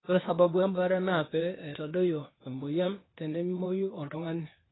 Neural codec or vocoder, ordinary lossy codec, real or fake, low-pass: codec, 16 kHz, 0.8 kbps, ZipCodec; AAC, 16 kbps; fake; 7.2 kHz